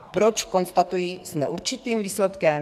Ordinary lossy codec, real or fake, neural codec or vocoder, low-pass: AAC, 96 kbps; fake; codec, 44.1 kHz, 2.6 kbps, SNAC; 14.4 kHz